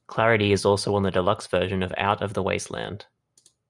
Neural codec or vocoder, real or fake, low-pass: none; real; 10.8 kHz